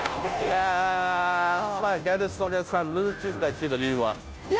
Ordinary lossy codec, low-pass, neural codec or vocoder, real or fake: none; none; codec, 16 kHz, 0.5 kbps, FunCodec, trained on Chinese and English, 25 frames a second; fake